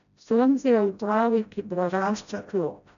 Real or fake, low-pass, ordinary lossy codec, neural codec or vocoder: fake; 7.2 kHz; none; codec, 16 kHz, 0.5 kbps, FreqCodec, smaller model